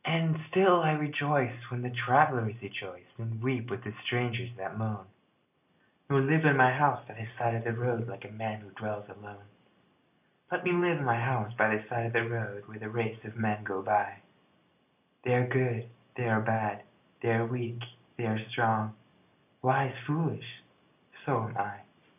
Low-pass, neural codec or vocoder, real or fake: 3.6 kHz; none; real